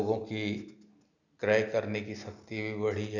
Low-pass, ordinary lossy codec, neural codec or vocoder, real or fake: 7.2 kHz; none; none; real